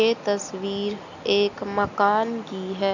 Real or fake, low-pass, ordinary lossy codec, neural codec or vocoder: real; 7.2 kHz; none; none